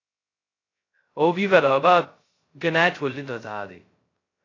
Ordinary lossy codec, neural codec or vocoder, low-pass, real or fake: AAC, 32 kbps; codec, 16 kHz, 0.2 kbps, FocalCodec; 7.2 kHz; fake